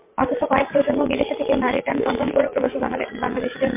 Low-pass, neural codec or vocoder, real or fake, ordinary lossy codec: 3.6 kHz; vocoder, 22.05 kHz, 80 mel bands, Vocos; fake; MP3, 24 kbps